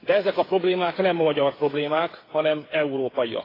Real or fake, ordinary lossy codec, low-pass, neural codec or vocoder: fake; AAC, 24 kbps; 5.4 kHz; codec, 16 kHz, 8 kbps, FreqCodec, smaller model